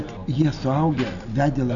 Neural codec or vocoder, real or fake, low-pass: none; real; 7.2 kHz